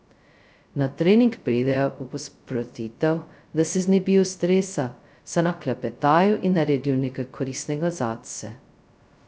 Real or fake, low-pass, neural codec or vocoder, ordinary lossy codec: fake; none; codec, 16 kHz, 0.2 kbps, FocalCodec; none